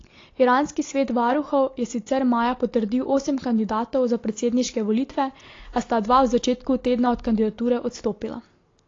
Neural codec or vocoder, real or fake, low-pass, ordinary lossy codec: none; real; 7.2 kHz; AAC, 32 kbps